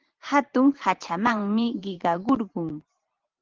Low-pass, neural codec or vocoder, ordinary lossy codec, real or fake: 7.2 kHz; vocoder, 44.1 kHz, 128 mel bands every 512 samples, BigVGAN v2; Opus, 16 kbps; fake